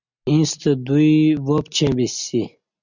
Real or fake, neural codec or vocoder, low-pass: real; none; 7.2 kHz